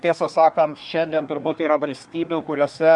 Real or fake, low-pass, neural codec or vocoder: fake; 10.8 kHz; codec, 24 kHz, 1 kbps, SNAC